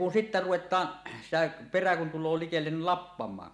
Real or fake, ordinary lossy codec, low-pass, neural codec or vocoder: real; none; none; none